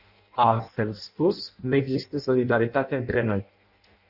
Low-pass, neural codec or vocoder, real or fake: 5.4 kHz; codec, 16 kHz in and 24 kHz out, 0.6 kbps, FireRedTTS-2 codec; fake